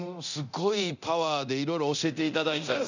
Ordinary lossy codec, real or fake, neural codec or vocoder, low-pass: none; fake; codec, 24 kHz, 0.9 kbps, DualCodec; 7.2 kHz